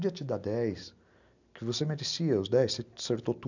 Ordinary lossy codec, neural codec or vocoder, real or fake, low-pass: none; none; real; 7.2 kHz